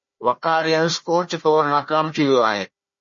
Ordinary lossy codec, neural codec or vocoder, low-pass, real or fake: MP3, 32 kbps; codec, 16 kHz, 1 kbps, FunCodec, trained on Chinese and English, 50 frames a second; 7.2 kHz; fake